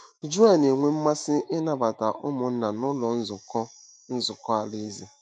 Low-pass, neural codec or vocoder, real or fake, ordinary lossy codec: 9.9 kHz; autoencoder, 48 kHz, 128 numbers a frame, DAC-VAE, trained on Japanese speech; fake; none